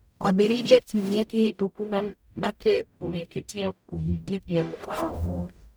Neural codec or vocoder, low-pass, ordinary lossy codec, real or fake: codec, 44.1 kHz, 0.9 kbps, DAC; none; none; fake